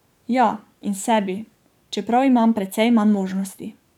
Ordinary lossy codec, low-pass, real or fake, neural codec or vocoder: none; 19.8 kHz; fake; codec, 44.1 kHz, 7.8 kbps, DAC